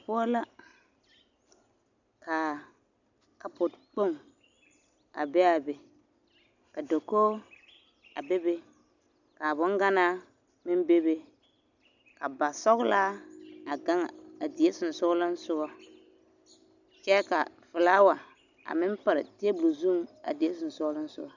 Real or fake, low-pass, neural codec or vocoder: real; 7.2 kHz; none